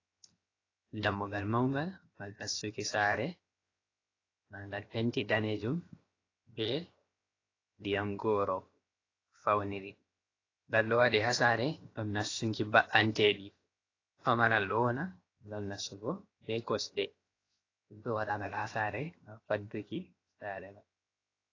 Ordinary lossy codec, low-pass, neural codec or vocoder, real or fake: AAC, 32 kbps; 7.2 kHz; codec, 16 kHz, 0.7 kbps, FocalCodec; fake